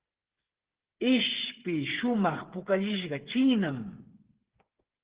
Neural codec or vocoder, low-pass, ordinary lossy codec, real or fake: codec, 16 kHz, 8 kbps, FreqCodec, smaller model; 3.6 kHz; Opus, 16 kbps; fake